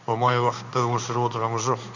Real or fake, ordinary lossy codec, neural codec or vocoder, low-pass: fake; none; codec, 16 kHz in and 24 kHz out, 1 kbps, XY-Tokenizer; 7.2 kHz